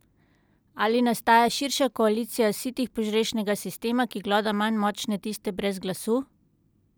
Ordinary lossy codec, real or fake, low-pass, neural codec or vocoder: none; real; none; none